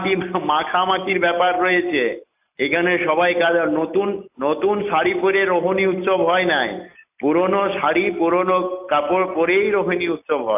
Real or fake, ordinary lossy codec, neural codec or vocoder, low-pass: real; AAC, 32 kbps; none; 3.6 kHz